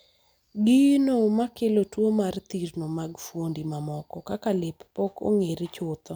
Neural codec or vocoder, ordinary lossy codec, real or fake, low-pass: none; none; real; none